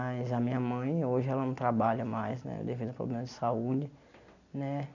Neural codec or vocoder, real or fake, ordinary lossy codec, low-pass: none; real; none; 7.2 kHz